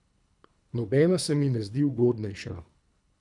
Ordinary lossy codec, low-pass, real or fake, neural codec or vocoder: none; 10.8 kHz; fake; codec, 24 kHz, 3 kbps, HILCodec